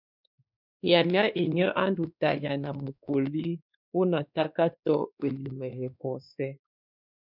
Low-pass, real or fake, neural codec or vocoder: 5.4 kHz; fake; codec, 16 kHz, 2 kbps, X-Codec, WavLM features, trained on Multilingual LibriSpeech